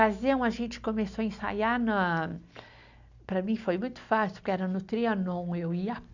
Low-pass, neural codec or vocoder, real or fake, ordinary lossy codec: 7.2 kHz; none; real; none